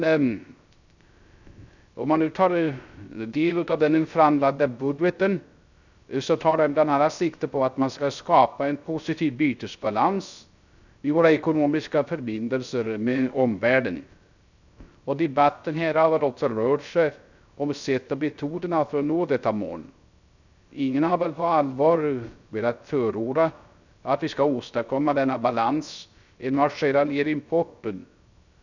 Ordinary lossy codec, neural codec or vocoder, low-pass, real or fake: none; codec, 16 kHz, 0.3 kbps, FocalCodec; 7.2 kHz; fake